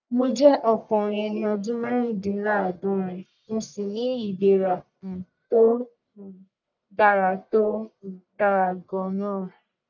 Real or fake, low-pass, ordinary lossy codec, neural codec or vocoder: fake; 7.2 kHz; none; codec, 44.1 kHz, 1.7 kbps, Pupu-Codec